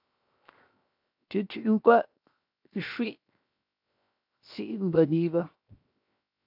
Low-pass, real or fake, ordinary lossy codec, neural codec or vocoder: 5.4 kHz; fake; AAC, 48 kbps; codec, 16 kHz, 0.7 kbps, FocalCodec